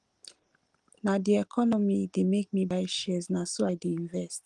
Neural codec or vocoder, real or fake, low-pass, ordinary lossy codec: none; real; 10.8 kHz; Opus, 24 kbps